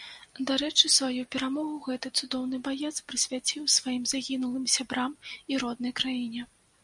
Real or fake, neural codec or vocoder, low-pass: real; none; 10.8 kHz